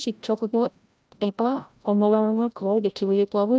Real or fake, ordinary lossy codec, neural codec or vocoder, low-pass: fake; none; codec, 16 kHz, 0.5 kbps, FreqCodec, larger model; none